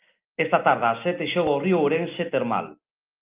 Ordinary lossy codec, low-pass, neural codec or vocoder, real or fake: Opus, 24 kbps; 3.6 kHz; none; real